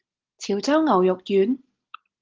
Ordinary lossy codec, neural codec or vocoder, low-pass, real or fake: Opus, 16 kbps; none; 7.2 kHz; real